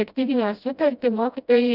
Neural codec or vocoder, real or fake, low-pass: codec, 16 kHz, 0.5 kbps, FreqCodec, smaller model; fake; 5.4 kHz